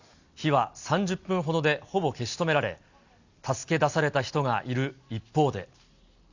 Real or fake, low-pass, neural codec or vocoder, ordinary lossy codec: real; 7.2 kHz; none; Opus, 64 kbps